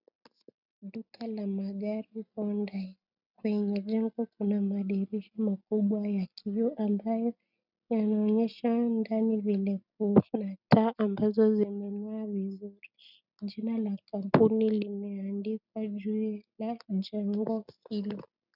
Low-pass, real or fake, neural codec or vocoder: 5.4 kHz; real; none